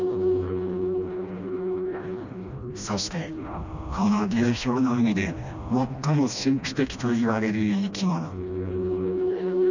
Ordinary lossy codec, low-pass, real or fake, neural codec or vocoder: none; 7.2 kHz; fake; codec, 16 kHz, 1 kbps, FreqCodec, smaller model